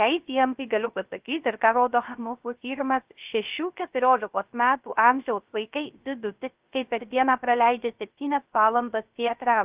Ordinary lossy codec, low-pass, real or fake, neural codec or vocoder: Opus, 32 kbps; 3.6 kHz; fake; codec, 16 kHz, 0.3 kbps, FocalCodec